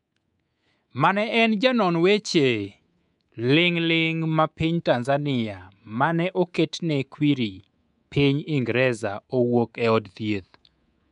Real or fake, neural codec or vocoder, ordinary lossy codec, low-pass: fake; codec, 24 kHz, 3.1 kbps, DualCodec; none; 10.8 kHz